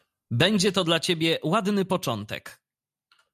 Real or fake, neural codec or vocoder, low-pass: real; none; 14.4 kHz